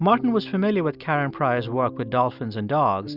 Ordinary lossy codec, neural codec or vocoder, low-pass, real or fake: Opus, 64 kbps; none; 5.4 kHz; real